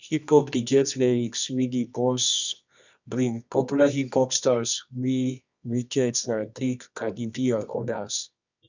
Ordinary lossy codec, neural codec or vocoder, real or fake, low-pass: none; codec, 24 kHz, 0.9 kbps, WavTokenizer, medium music audio release; fake; 7.2 kHz